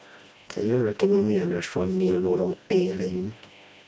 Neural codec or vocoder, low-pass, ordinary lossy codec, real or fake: codec, 16 kHz, 1 kbps, FreqCodec, smaller model; none; none; fake